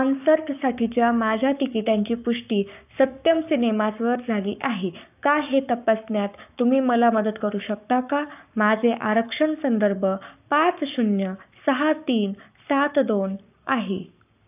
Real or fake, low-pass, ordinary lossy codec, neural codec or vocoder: fake; 3.6 kHz; none; codec, 44.1 kHz, 7.8 kbps, Pupu-Codec